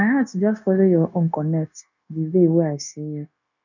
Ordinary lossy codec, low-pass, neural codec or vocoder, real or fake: none; 7.2 kHz; codec, 16 kHz, 0.9 kbps, LongCat-Audio-Codec; fake